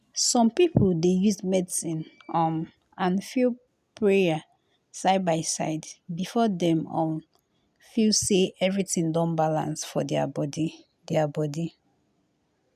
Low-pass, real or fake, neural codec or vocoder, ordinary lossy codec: 14.4 kHz; real; none; none